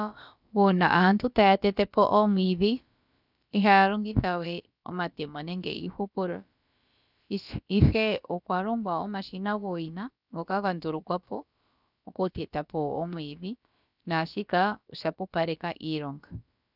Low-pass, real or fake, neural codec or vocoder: 5.4 kHz; fake; codec, 16 kHz, about 1 kbps, DyCAST, with the encoder's durations